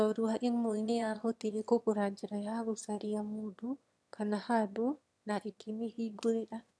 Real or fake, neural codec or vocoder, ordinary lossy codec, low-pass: fake; autoencoder, 22.05 kHz, a latent of 192 numbers a frame, VITS, trained on one speaker; none; none